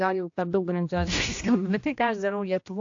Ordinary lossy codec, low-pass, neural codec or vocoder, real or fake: AAC, 48 kbps; 7.2 kHz; codec, 16 kHz, 1 kbps, X-Codec, HuBERT features, trained on general audio; fake